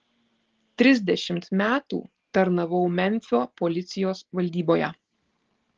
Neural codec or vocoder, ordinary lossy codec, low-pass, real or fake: none; Opus, 16 kbps; 7.2 kHz; real